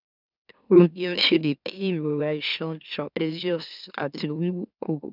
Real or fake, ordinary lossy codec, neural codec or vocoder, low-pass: fake; none; autoencoder, 44.1 kHz, a latent of 192 numbers a frame, MeloTTS; 5.4 kHz